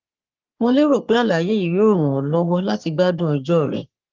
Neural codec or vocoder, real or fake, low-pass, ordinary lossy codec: codec, 44.1 kHz, 3.4 kbps, Pupu-Codec; fake; 7.2 kHz; Opus, 24 kbps